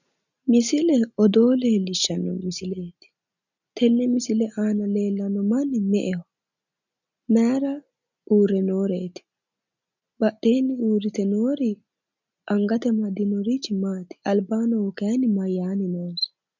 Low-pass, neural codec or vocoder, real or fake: 7.2 kHz; none; real